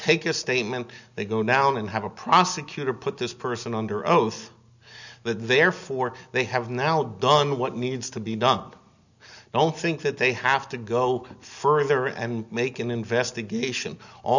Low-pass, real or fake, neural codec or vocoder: 7.2 kHz; real; none